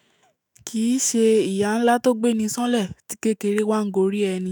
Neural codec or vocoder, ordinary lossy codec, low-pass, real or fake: autoencoder, 48 kHz, 128 numbers a frame, DAC-VAE, trained on Japanese speech; none; none; fake